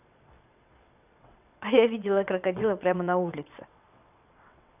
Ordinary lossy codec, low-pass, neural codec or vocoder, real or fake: none; 3.6 kHz; vocoder, 22.05 kHz, 80 mel bands, WaveNeXt; fake